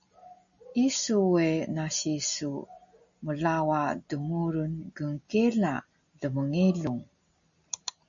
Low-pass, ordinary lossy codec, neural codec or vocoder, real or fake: 7.2 kHz; AAC, 64 kbps; none; real